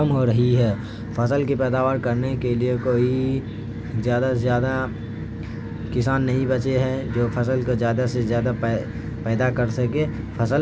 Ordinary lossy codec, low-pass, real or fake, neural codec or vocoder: none; none; real; none